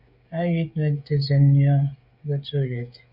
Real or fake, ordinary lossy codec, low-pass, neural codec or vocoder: fake; Opus, 64 kbps; 5.4 kHz; codec, 16 kHz, 16 kbps, FreqCodec, smaller model